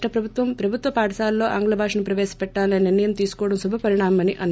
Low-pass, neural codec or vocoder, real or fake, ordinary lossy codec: none; none; real; none